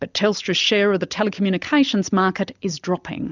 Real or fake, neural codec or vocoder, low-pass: real; none; 7.2 kHz